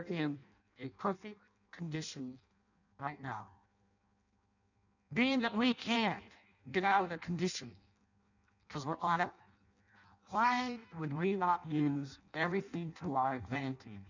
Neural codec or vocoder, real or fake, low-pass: codec, 16 kHz in and 24 kHz out, 0.6 kbps, FireRedTTS-2 codec; fake; 7.2 kHz